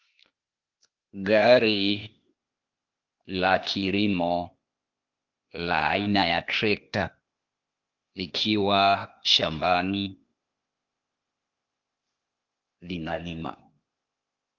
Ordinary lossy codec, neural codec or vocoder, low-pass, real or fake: Opus, 24 kbps; codec, 16 kHz, 0.8 kbps, ZipCodec; 7.2 kHz; fake